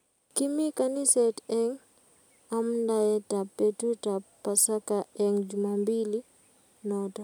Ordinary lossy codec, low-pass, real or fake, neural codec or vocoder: none; none; real; none